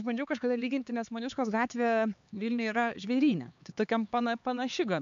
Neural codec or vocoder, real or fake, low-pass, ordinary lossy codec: codec, 16 kHz, 4 kbps, X-Codec, HuBERT features, trained on LibriSpeech; fake; 7.2 kHz; MP3, 64 kbps